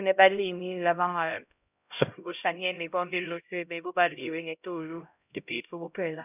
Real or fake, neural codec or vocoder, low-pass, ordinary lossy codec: fake; codec, 16 kHz, 0.5 kbps, X-Codec, HuBERT features, trained on LibriSpeech; 3.6 kHz; none